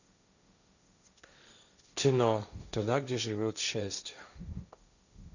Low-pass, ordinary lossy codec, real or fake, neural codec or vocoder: 7.2 kHz; none; fake; codec, 16 kHz, 1.1 kbps, Voila-Tokenizer